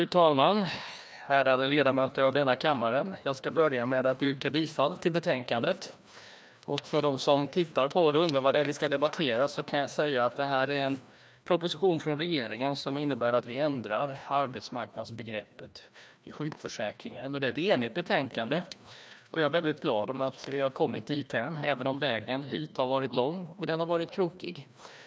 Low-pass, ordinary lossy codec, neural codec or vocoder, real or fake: none; none; codec, 16 kHz, 1 kbps, FreqCodec, larger model; fake